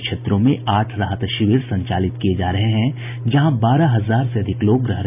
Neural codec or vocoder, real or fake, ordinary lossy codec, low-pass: none; real; none; 3.6 kHz